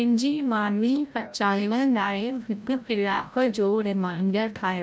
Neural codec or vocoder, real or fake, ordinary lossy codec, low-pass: codec, 16 kHz, 0.5 kbps, FreqCodec, larger model; fake; none; none